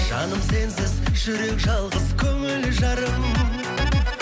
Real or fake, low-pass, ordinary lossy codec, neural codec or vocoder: real; none; none; none